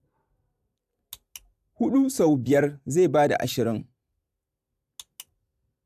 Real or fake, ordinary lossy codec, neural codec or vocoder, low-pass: real; AAC, 96 kbps; none; 14.4 kHz